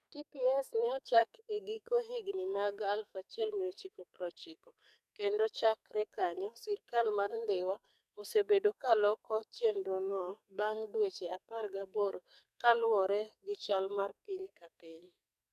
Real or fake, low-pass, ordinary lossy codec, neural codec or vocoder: fake; 14.4 kHz; none; codec, 44.1 kHz, 2.6 kbps, SNAC